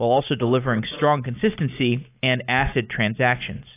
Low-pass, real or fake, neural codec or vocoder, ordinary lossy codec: 3.6 kHz; real; none; AAC, 24 kbps